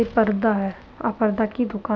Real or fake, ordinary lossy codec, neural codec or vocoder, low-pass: real; none; none; none